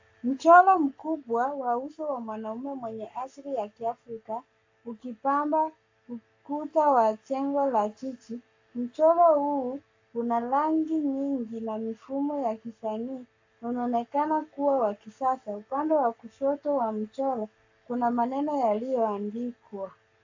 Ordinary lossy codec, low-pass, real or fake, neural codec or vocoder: AAC, 48 kbps; 7.2 kHz; fake; codec, 44.1 kHz, 7.8 kbps, Pupu-Codec